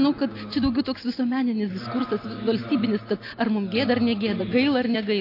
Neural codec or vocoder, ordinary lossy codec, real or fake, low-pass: none; AAC, 32 kbps; real; 5.4 kHz